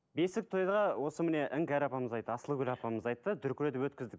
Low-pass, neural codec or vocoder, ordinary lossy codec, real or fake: none; none; none; real